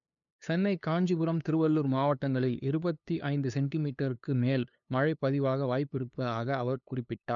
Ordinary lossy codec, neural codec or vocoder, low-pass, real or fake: none; codec, 16 kHz, 2 kbps, FunCodec, trained on LibriTTS, 25 frames a second; 7.2 kHz; fake